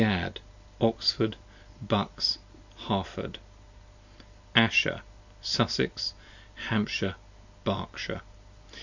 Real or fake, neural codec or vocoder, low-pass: real; none; 7.2 kHz